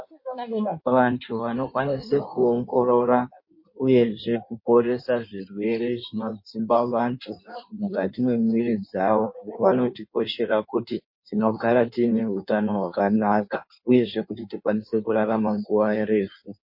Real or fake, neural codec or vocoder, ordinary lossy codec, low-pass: fake; codec, 16 kHz in and 24 kHz out, 1.1 kbps, FireRedTTS-2 codec; MP3, 32 kbps; 5.4 kHz